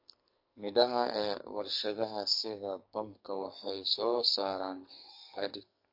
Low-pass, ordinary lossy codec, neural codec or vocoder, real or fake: 5.4 kHz; MP3, 32 kbps; codec, 44.1 kHz, 2.6 kbps, SNAC; fake